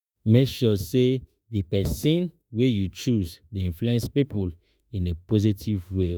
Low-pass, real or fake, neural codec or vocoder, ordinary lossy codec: none; fake; autoencoder, 48 kHz, 32 numbers a frame, DAC-VAE, trained on Japanese speech; none